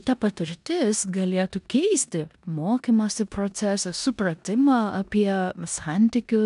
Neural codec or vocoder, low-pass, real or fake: codec, 16 kHz in and 24 kHz out, 0.9 kbps, LongCat-Audio-Codec, four codebook decoder; 10.8 kHz; fake